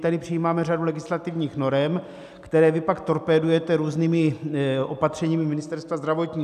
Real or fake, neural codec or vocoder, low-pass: real; none; 14.4 kHz